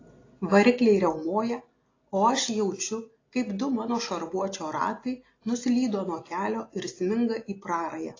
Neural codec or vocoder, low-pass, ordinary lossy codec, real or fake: none; 7.2 kHz; AAC, 32 kbps; real